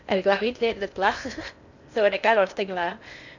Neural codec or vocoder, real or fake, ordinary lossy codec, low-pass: codec, 16 kHz in and 24 kHz out, 0.6 kbps, FocalCodec, streaming, 2048 codes; fake; none; 7.2 kHz